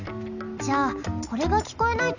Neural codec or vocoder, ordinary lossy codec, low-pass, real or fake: none; none; 7.2 kHz; real